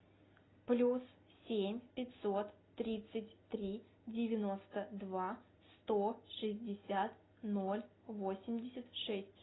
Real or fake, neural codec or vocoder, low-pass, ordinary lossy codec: real; none; 7.2 kHz; AAC, 16 kbps